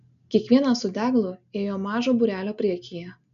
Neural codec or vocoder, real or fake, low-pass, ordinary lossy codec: none; real; 7.2 kHz; AAC, 96 kbps